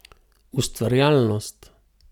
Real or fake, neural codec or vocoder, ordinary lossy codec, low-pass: real; none; none; 19.8 kHz